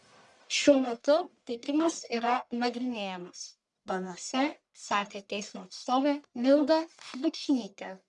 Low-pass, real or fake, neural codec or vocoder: 10.8 kHz; fake; codec, 44.1 kHz, 1.7 kbps, Pupu-Codec